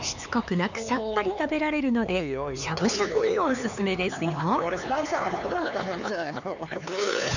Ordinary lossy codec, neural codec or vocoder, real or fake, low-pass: none; codec, 16 kHz, 4 kbps, X-Codec, HuBERT features, trained on LibriSpeech; fake; 7.2 kHz